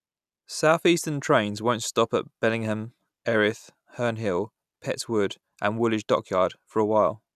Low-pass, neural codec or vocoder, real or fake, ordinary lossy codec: 14.4 kHz; none; real; none